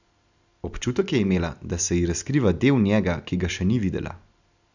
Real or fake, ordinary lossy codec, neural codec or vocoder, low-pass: real; none; none; 7.2 kHz